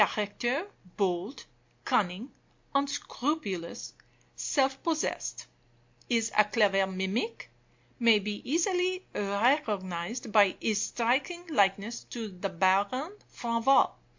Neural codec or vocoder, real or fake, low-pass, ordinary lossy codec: none; real; 7.2 kHz; MP3, 48 kbps